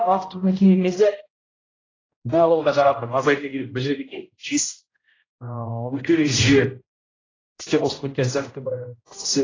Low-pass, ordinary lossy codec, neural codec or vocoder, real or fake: 7.2 kHz; AAC, 32 kbps; codec, 16 kHz, 0.5 kbps, X-Codec, HuBERT features, trained on general audio; fake